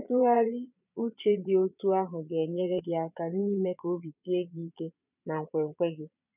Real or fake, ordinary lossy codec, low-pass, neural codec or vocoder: fake; none; 3.6 kHz; vocoder, 22.05 kHz, 80 mel bands, Vocos